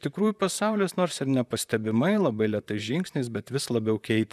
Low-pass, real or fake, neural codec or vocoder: 14.4 kHz; fake; vocoder, 44.1 kHz, 128 mel bands, Pupu-Vocoder